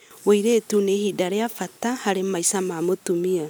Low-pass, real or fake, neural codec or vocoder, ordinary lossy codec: none; real; none; none